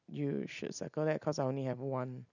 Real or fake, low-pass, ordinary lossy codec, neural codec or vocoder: real; 7.2 kHz; none; none